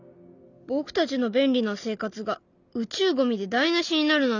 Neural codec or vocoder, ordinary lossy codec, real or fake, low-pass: none; none; real; 7.2 kHz